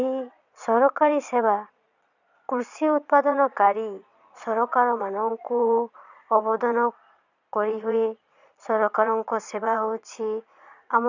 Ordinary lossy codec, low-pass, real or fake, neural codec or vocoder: none; 7.2 kHz; fake; vocoder, 22.05 kHz, 80 mel bands, Vocos